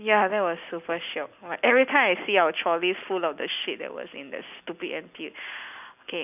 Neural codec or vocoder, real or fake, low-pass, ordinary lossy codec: none; real; 3.6 kHz; none